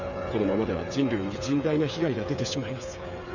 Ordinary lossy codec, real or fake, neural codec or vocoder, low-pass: none; fake; codec, 16 kHz, 8 kbps, FreqCodec, smaller model; 7.2 kHz